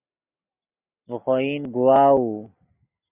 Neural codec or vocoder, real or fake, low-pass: none; real; 3.6 kHz